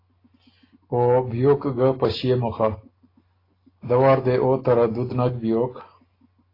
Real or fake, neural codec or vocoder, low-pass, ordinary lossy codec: real; none; 5.4 kHz; AAC, 24 kbps